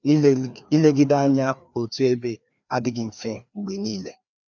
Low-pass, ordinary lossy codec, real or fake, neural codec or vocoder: 7.2 kHz; none; fake; codec, 16 kHz, 2 kbps, FreqCodec, larger model